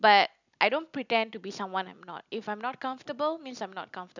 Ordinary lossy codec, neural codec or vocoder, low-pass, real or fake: none; none; 7.2 kHz; real